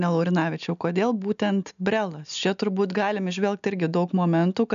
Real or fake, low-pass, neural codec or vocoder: real; 7.2 kHz; none